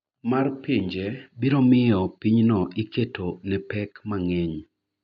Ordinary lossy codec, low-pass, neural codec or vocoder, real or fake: none; 7.2 kHz; none; real